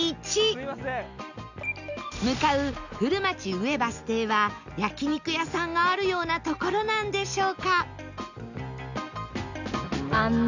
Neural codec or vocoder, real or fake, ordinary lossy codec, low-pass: none; real; none; 7.2 kHz